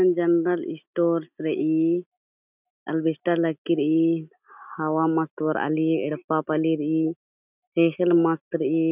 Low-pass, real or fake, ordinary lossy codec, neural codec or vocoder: 3.6 kHz; real; none; none